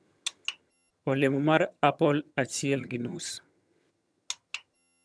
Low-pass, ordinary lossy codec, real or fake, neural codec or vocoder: none; none; fake; vocoder, 22.05 kHz, 80 mel bands, HiFi-GAN